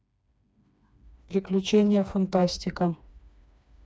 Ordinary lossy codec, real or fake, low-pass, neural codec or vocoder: none; fake; none; codec, 16 kHz, 2 kbps, FreqCodec, smaller model